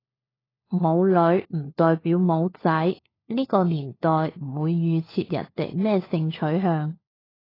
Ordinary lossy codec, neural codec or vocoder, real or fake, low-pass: AAC, 24 kbps; codec, 16 kHz, 4 kbps, FunCodec, trained on LibriTTS, 50 frames a second; fake; 5.4 kHz